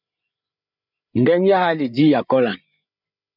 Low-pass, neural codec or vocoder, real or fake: 5.4 kHz; codec, 16 kHz, 8 kbps, FreqCodec, larger model; fake